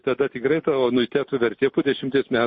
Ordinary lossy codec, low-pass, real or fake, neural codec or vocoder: MP3, 32 kbps; 10.8 kHz; real; none